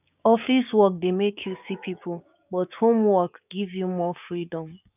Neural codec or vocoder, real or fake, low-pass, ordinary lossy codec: codec, 16 kHz, 6 kbps, DAC; fake; 3.6 kHz; none